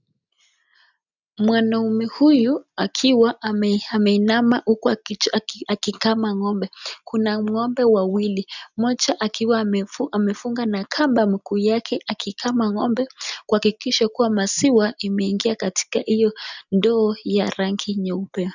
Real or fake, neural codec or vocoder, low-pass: real; none; 7.2 kHz